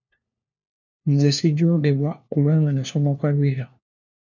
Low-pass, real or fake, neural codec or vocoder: 7.2 kHz; fake; codec, 16 kHz, 1 kbps, FunCodec, trained on LibriTTS, 50 frames a second